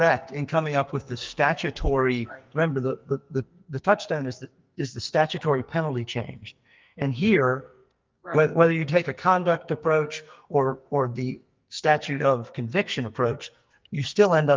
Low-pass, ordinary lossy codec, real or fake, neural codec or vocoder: 7.2 kHz; Opus, 24 kbps; fake; codec, 44.1 kHz, 2.6 kbps, SNAC